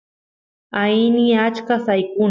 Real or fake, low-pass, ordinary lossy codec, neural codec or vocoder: real; 7.2 kHz; MP3, 64 kbps; none